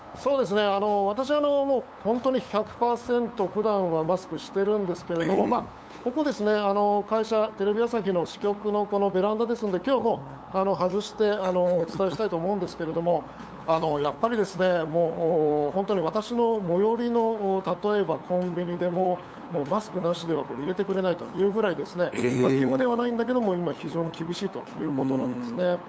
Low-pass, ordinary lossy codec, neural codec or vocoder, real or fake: none; none; codec, 16 kHz, 8 kbps, FunCodec, trained on LibriTTS, 25 frames a second; fake